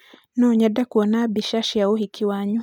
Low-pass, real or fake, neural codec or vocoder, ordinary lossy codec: 19.8 kHz; real; none; none